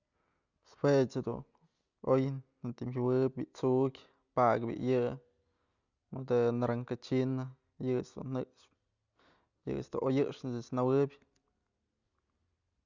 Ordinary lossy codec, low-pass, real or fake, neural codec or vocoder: Opus, 64 kbps; 7.2 kHz; real; none